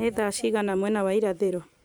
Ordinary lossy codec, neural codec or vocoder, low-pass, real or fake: none; vocoder, 44.1 kHz, 128 mel bands every 256 samples, BigVGAN v2; none; fake